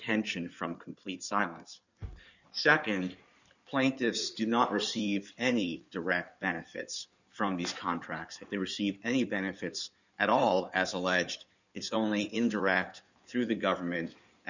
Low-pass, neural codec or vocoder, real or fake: 7.2 kHz; codec, 16 kHz in and 24 kHz out, 2.2 kbps, FireRedTTS-2 codec; fake